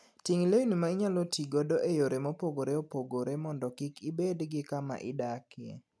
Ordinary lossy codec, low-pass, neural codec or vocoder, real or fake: none; none; none; real